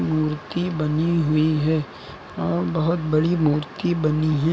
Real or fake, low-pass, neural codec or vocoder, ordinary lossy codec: real; none; none; none